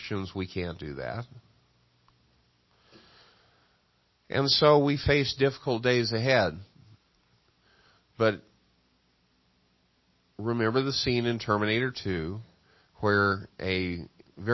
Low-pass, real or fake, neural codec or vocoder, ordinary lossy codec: 7.2 kHz; real; none; MP3, 24 kbps